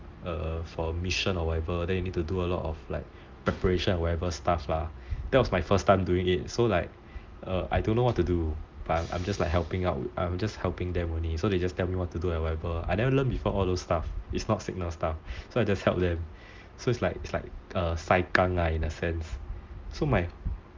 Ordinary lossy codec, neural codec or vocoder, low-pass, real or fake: Opus, 24 kbps; none; 7.2 kHz; real